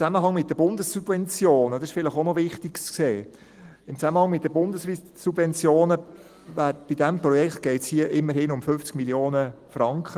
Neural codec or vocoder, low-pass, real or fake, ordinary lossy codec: none; 14.4 kHz; real; Opus, 24 kbps